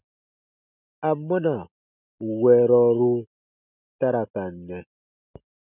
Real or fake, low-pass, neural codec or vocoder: real; 3.6 kHz; none